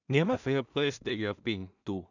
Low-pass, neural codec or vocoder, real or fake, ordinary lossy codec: 7.2 kHz; codec, 16 kHz in and 24 kHz out, 0.4 kbps, LongCat-Audio-Codec, two codebook decoder; fake; none